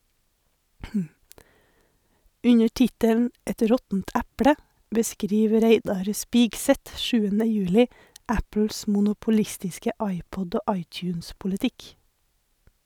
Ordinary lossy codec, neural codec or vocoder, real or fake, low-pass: none; none; real; 19.8 kHz